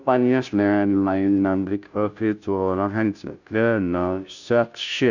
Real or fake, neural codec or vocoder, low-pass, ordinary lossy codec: fake; codec, 16 kHz, 0.5 kbps, FunCodec, trained on Chinese and English, 25 frames a second; 7.2 kHz; none